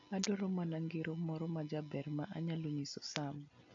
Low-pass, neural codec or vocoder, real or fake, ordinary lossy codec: 7.2 kHz; none; real; AAC, 64 kbps